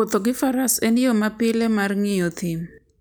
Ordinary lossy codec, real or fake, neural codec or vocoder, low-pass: none; real; none; none